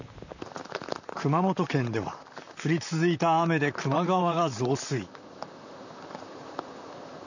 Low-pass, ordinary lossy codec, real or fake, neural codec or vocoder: 7.2 kHz; none; fake; vocoder, 44.1 kHz, 128 mel bands, Pupu-Vocoder